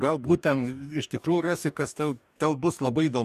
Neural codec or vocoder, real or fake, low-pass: codec, 44.1 kHz, 2.6 kbps, DAC; fake; 14.4 kHz